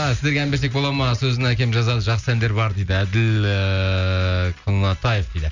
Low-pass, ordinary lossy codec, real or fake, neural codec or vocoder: 7.2 kHz; none; real; none